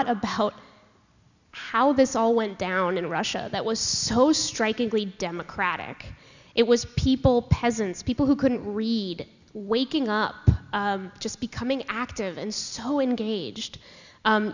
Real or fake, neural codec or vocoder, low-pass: real; none; 7.2 kHz